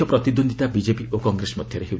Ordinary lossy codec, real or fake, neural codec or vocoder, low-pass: none; real; none; none